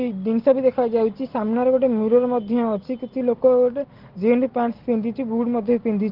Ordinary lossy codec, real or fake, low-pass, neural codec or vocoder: Opus, 16 kbps; real; 5.4 kHz; none